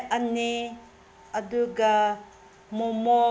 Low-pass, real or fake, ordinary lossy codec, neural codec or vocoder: none; real; none; none